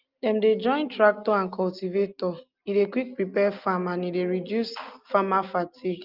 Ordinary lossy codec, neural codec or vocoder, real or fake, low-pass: Opus, 24 kbps; none; real; 5.4 kHz